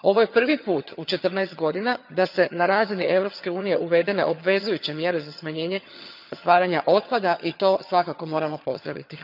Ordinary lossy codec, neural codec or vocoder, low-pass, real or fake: none; vocoder, 22.05 kHz, 80 mel bands, HiFi-GAN; 5.4 kHz; fake